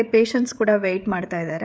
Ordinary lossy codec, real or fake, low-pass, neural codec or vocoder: none; fake; none; codec, 16 kHz, 16 kbps, FreqCodec, smaller model